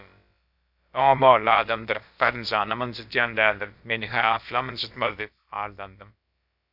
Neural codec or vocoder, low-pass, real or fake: codec, 16 kHz, about 1 kbps, DyCAST, with the encoder's durations; 5.4 kHz; fake